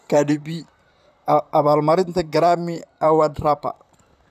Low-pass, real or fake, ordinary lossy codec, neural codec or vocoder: 14.4 kHz; fake; none; vocoder, 44.1 kHz, 128 mel bands, Pupu-Vocoder